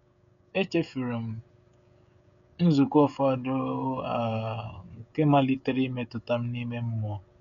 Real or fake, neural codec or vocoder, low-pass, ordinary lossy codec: fake; codec, 16 kHz, 16 kbps, FreqCodec, smaller model; 7.2 kHz; none